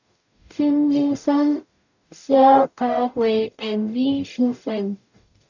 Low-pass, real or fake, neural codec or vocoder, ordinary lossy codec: 7.2 kHz; fake; codec, 44.1 kHz, 0.9 kbps, DAC; none